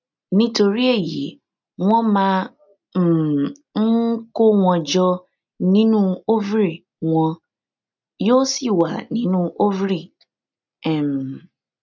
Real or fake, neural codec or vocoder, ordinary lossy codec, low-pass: real; none; none; 7.2 kHz